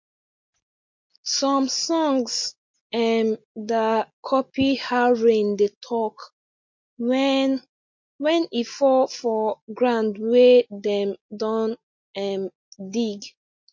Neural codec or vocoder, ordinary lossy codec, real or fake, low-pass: none; MP3, 48 kbps; real; 7.2 kHz